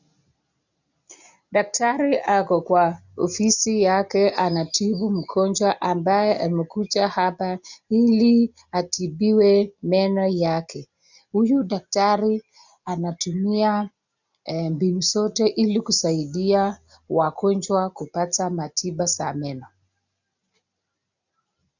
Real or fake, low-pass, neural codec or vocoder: real; 7.2 kHz; none